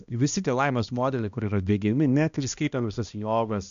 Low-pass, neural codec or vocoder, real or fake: 7.2 kHz; codec, 16 kHz, 1 kbps, X-Codec, HuBERT features, trained on balanced general audio; fake